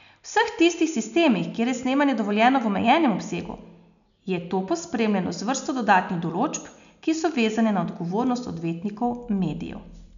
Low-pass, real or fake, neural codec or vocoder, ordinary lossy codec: 7.2 kHz; real; none; none